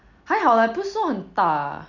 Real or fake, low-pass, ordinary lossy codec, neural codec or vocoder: real; 7.2 kHz; none; none